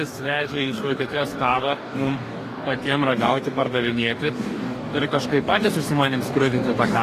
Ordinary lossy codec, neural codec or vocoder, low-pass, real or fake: AAC, 48 kbps; codec, 44.1 kHz, 2.6 kbps, DAC; 14.4 kHz; fake